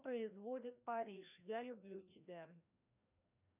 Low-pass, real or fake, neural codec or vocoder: 3.6 kHz; fake; codec, 16 kHz, 1 kbps, FreqCodec, larger model